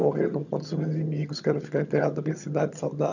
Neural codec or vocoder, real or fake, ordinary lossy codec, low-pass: vocoder, 22.05 kHz, 80 mel bands, HiFi-GAN; fake; none; 7.2 kHz